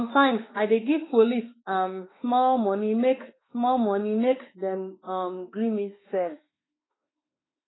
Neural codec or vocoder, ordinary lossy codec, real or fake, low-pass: autoencoder, 48 kHz, 32 numbers a frame, DAC-VAE, trained on Japanese speech; AAC, 16 kbps; fake; 7.2 kHz